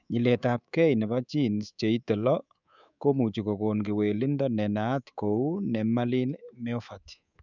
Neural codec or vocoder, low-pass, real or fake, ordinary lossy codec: codec, 24 kHz, 3.1 kbps, DualCodec; 7.2 kHz; fake; none